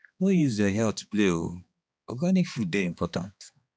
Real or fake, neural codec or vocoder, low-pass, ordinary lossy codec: fake; codec, 16 kHz, 2 kbps, X-Codec, HuBERT features, trained on balanced general audio; none; none